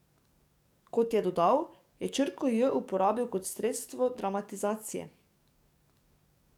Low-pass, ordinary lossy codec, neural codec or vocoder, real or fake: 19.8 kHz; none; codec, 44.1 kHz, 7.8 kbps, DAC; fake